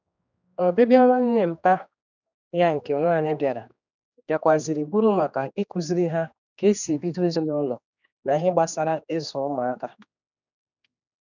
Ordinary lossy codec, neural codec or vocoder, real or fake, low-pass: none; codec, 16 kHz, 2 kbps, X-Codec, HuBERT features, trained on general audio; fake; 7.2 kHz